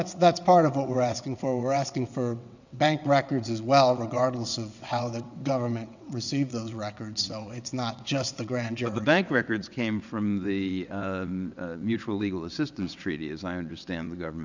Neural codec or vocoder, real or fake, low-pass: vocoder, 22.05 kHz, 80 mel bands, WaveNeXt; fake; 7.2 kHz